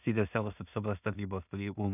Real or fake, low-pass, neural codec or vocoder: fake; 3.6 kHz; codec, 16 kHz in and 24 kHz out, 0.4 kbps, LongCat-Audio-Codec, two codebook decoder